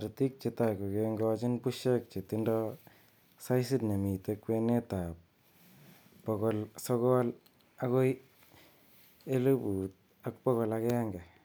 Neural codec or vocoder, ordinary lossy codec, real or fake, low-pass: none; none; real; none